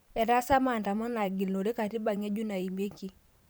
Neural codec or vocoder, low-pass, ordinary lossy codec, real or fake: vocoder, 44.1 kHz, 128 mel bands every 512 samples, BigVGAN v2; none; none; fake